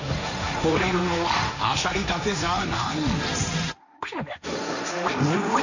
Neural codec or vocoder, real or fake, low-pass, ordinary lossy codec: codec, 16 kHz, 1.1 kbps, Voila-Tokenizer; fake; 7.2 kHz; none